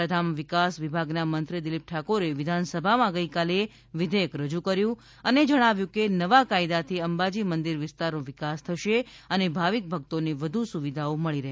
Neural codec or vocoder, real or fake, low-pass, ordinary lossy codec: none; real; none; none